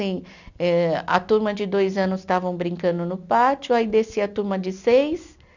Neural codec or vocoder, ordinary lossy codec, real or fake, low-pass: none; none; real; 7.2 kHz